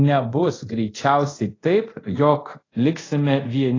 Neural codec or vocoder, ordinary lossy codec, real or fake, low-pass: codec, 24 kHz, 0.5 kbps, DualCodec; AAC, 32 kbps; fake; 7.2 kHz